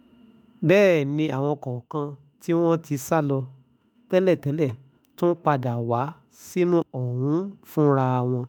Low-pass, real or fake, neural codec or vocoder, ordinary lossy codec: none; fake; autoencoder, 48 kHz, 32 numbers a frame, DAC-VAE, trained on Japanese speech; none